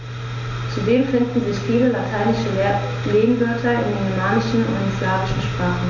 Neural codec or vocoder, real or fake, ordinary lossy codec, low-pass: none; real; none; 7.2 kHz